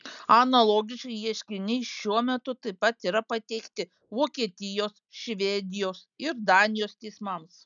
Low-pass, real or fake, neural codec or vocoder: 7.2 kHz; real; none